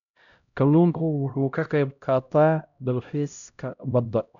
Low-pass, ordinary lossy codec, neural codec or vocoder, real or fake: 7.2 kHz; none; codec, 16 kHz, 0.5 kbps, X-Codec, HuBERT features, trained on LibriSpeech; fake